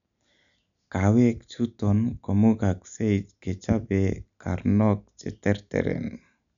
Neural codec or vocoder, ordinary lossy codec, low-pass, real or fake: none; none; 7.2 kHz; real